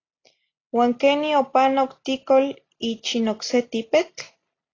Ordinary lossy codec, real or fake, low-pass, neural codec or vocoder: AAC, 32 kbps; real; 7.2 kHz; none